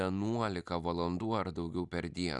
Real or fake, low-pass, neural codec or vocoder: real; 10.8 kHz; none